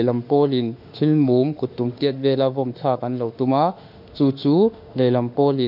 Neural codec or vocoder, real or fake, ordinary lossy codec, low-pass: autoencoder, 48 kHz, 32 numbers a frame, DAC-VAE, trained on Japanese speech; fake; none; 5.4 kHz